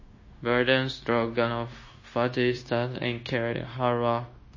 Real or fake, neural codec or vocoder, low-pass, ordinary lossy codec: fake; codec, 16 kHz, 0.9 kbps, LongCat-Audio-Codec; 7.2 kHz; MP3, 32 kbps